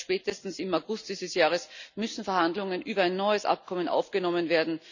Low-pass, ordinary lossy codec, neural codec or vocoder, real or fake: 7.2 kHz; none; none; real